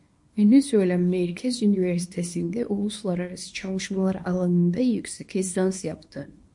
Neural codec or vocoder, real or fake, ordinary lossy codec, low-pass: codec, 24 kHz, 0.9 kbps, WavTokenizer, small release; fake; MP3, 48 kbps; 10.8 kHz